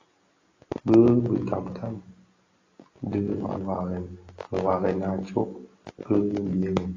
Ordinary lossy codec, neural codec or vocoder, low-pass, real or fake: AAC, 32 kbps; none; 7.2 kHz; real